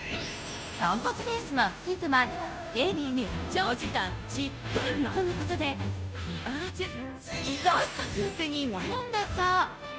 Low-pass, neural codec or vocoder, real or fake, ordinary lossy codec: none; codec, 16 kHz, 0.5 kbps, FunCodec, trained on Chinese and English, 25 frames a second; fake; none